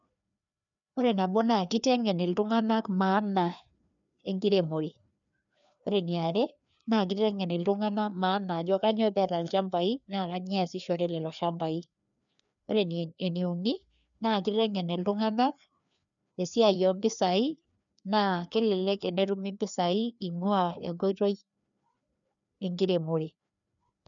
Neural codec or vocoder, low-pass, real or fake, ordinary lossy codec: codec, 16 kHz, 2 kbps, FreqCodec, larger model; 7.2 kHz; fake; none